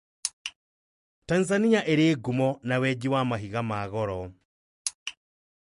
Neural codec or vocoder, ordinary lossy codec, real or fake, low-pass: none; MP3, 48 kbps; real; 10.8 kHz